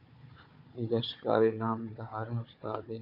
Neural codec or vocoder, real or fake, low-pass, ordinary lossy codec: codec, 16 kHz, 4 kbps, FunCodec, trained on Chinese and English, 50 frames a second; fake; 5.4 kHz; AAC, 48 kbps